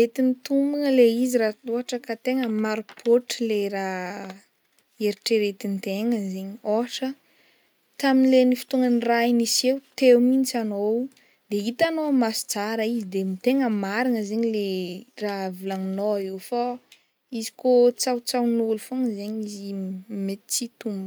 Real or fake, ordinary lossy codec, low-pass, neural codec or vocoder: real; none; none; none